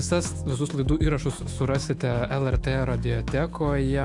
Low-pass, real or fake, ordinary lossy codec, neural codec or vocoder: 10.8 kHz; fake; AAC, 64 kbps; autoencoder, 48 kHz, 128 numbers a frame, DAC-VAE, trained on Japanese speech